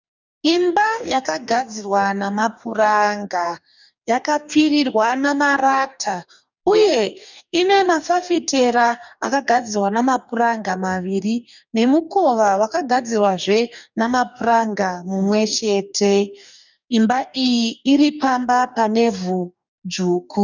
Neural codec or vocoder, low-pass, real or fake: codec, 44.1 kHz, 2.6 kbps, DAC; 7.2 kHz; fake